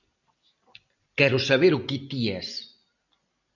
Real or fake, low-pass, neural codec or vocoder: real; 7.2 kHz; none